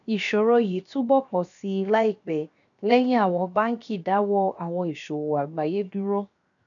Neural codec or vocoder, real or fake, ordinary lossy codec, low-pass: codec, 16 kHz, 0.7 kbps, FocalCodec; fake; none; 7.2 kHz